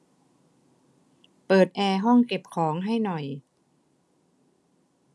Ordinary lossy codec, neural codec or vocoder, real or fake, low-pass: none; none; real; none